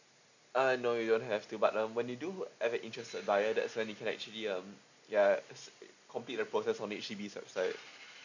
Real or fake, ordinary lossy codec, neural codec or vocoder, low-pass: real; none; none; 7.2 kHz